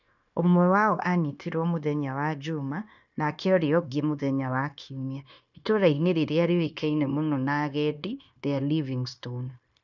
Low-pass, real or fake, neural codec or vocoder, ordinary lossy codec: 7.2 kHz; fake; codec, 16 kHz, 0.9 kbps, LongCat-Audio-Codec; none